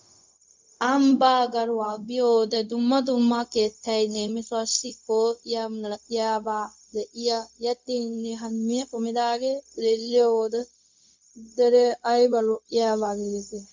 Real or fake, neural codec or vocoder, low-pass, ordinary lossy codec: fake; codec, 16 kHz, 0.4 kbps, LongCat-Audio-Codec; 7.2 kHz; MP3, 64 kbps